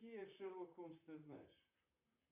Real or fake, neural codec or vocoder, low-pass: fake; vocoder, 44.1 kHz, 128 mel bands, Pupu-Vocoder; 3.6 kHz